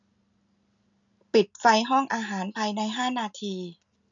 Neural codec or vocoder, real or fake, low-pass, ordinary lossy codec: none; real; 7.2 kHz; none